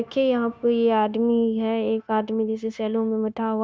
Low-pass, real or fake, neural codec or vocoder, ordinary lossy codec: none; fake; codec, 16 kHz, 0.9 kbps, LongCat-Audio-Codec; none